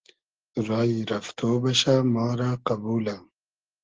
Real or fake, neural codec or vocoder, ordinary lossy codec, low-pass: real; none; Opus, 16 kbps; 7.2 kHz